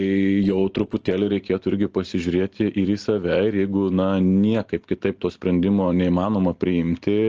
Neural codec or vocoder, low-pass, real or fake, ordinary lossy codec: none; 7.2 kHz; real; Opus, 16 kbps